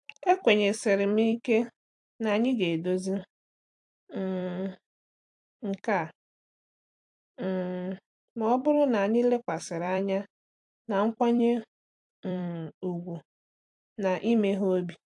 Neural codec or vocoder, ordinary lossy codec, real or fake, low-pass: vocoder, 44.1 kHz, 128 mel bands every 256 samples, BigVGAN v2; AAC, 64 kbps; fake; 10.8 kHz